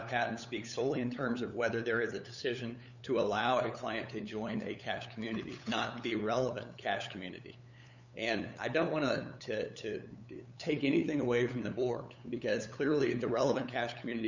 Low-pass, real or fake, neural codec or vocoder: 7.2 kHz; fake; codec, 16 kHz, 8 kbps, FunCodec, trained on LibriTTS, 25 frames a second